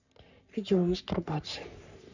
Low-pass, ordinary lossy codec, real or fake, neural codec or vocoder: 7.2 kHz; none; fake; codec, 44.1 kHz, 3.4 kbps, Pupu-Codec